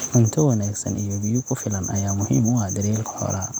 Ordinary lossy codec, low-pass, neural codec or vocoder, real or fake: none; none; none; real